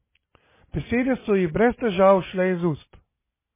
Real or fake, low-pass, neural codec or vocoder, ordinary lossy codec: real; 3.6 kHz; none; MP3, 16 kbps